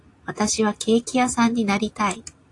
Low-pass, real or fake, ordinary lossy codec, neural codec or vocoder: 10.8 kHz; real; AAC, 48 kbps; none